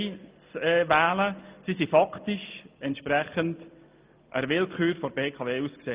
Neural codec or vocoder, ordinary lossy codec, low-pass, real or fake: none; Opus, 24 kbps; 3.6 kHz; real